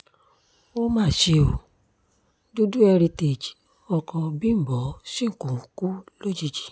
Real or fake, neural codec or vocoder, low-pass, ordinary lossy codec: real; none; none; none